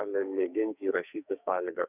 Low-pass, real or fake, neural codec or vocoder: 3.6 kHz; fake; codec, 44.1 kHz, 2.6 kbps, SNAC